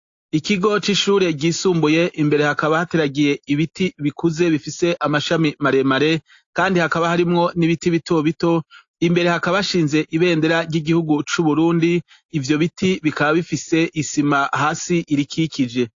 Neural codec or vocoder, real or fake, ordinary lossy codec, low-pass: none; real; AAC, 48 kbps; 7.2 kHz